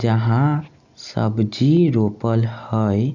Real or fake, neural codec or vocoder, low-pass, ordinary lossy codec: real; none; 7.2 kHz; none